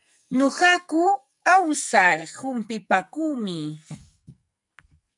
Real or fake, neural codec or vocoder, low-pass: fake; codec, 44.1 kHz, 2.6 kbps, SNAC; 10.8 kHz